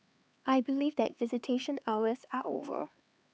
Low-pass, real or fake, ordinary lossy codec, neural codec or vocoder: none; fake; none; codec, 16 kHz, 4 kbps, X-Codec, HuBERT features, trained on LibriSpeech